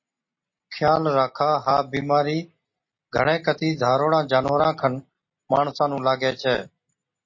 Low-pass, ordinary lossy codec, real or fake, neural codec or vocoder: 7.2 kHz; MP3, 32 kbps; real; none